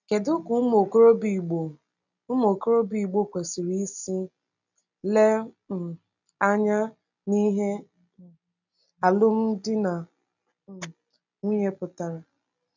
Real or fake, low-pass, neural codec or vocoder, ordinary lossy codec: real; 7.2 kHz; none; none